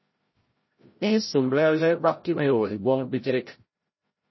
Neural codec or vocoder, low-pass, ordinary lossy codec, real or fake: codec, 16 kHz, 0.5 kbps, FreqCodec, larger model; 7.2 kHz; MP3, 24 kbps; fake